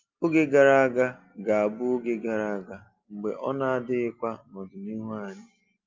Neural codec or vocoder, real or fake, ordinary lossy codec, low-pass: none; real; Opus, 24 kbps; 7.2 kHz